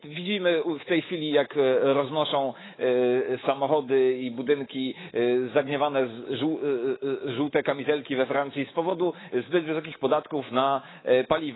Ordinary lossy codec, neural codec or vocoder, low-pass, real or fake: AAC, 16 kbps; codec, 24 kHz, 3.1 kbps, DualCodec; 7.2 kHz; fake